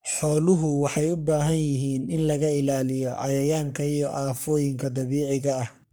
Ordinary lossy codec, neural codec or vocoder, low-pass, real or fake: none; codec, 44.1 kHz, 3.4 kbps, Pupu-Codec; none; fake